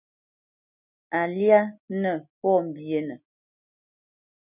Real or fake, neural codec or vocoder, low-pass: real; none; 3.6 kHz